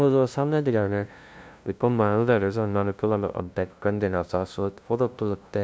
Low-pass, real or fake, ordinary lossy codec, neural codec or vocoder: none; fake; none; codec, 16 kHz, 0.5 kbps, FunCodec, trained on LibriTTS, 25 frames a second